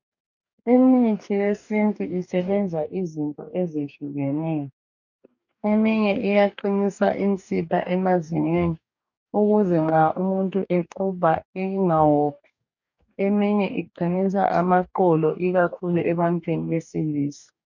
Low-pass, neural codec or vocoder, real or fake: 7.2 kHz; codec, 44.1 kHz, 2.6 kbps, DAC; fake